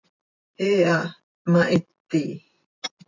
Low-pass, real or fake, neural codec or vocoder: 7.2 kHz; fake; vocoder, 44.1 kHz, 128 mel bands every 256 samples, BigVGAN v2